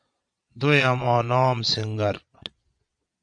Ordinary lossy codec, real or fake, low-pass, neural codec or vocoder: MP3, 64 kbps; fake; 9.9 kHz; vocoder, 22.05 kHz, 80 mel bands, Vocos